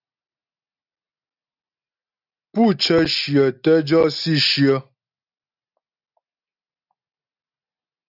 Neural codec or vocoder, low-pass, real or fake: none; 5.4 kHz; real